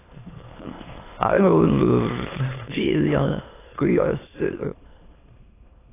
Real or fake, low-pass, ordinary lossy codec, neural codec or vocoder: fake; 3.6 kHz; AAC, 16 kbps; autoencoder, 22.05 kHz, a latent of 192 numbers a frame, VITS, trained on many speakers